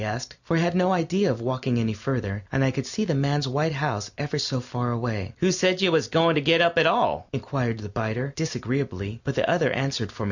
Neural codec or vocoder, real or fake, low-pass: none; real; 7.2 kHz